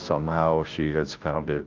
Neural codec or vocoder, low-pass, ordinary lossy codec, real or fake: codec, 16 kHz, 0.5 kbps, FunCodec, trained on Chinese and English, 25 frames a second; 7.2 kHz; Opus, 32 kbps; fake